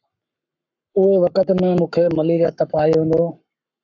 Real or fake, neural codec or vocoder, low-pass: fake; codec, 44.1 kHz, 7.8 kbps, Pupu-Codec; 7.2 kHz